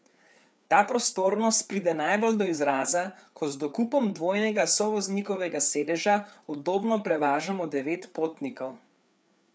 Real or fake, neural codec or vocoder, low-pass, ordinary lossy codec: fake; codec, 16 kHz, 4 kbps, FreqCodec, larger model; none; none